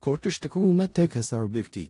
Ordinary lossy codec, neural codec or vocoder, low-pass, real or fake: AAC, 48 kbps; codec, 16 kHz in and 24 kHz out, 0.4 kbps, LongCat-Audio-Codec, four codebook decoder; 10.8 kHz; fake